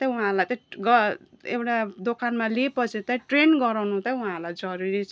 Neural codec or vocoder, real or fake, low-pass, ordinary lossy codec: none; real; none; none